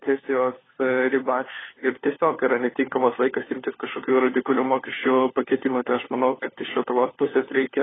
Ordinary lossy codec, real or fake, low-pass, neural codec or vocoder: AAC, 16 kbps; fake; 7.2 kHz; codec, 16 kHz, 4 kbps, FunCodec, trained on LibriTTS, 50 frames a second